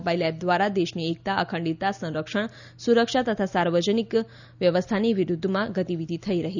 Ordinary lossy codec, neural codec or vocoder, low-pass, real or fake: none; none; 7.2 kHz; real